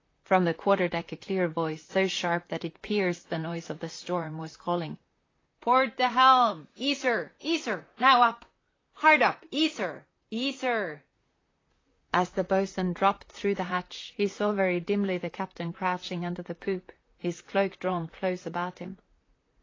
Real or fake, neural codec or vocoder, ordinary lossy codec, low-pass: fake; vocoder, 44.1 kHz, 128 mel bands, Pupu-Vocoder; AAC, 32 kbps; 7.2 kHz